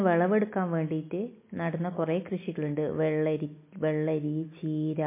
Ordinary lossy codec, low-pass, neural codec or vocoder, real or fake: MP3, 24 kbps; 3.6 kHz; none; real